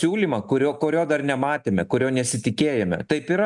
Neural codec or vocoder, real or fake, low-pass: none; real; 10.8 kHz